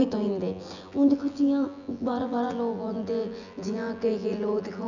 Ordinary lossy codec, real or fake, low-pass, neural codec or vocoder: none; fake; 7.2 kHz; vocoder, 24 kHz, 100 mel bands, Vocos